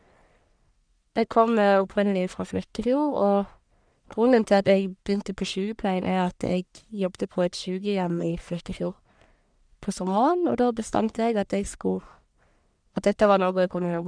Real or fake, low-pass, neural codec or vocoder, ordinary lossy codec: fake; 9.9 kHz; codec, 44.1 kHz, 1.7 kbps, Pupu-Codec; none